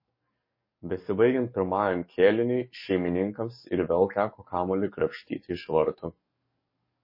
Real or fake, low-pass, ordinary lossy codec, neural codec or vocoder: fake; 5.4 kHz; MP3, 24 kbps; codec, 44.1 kHz, 7.8 kbps, DAC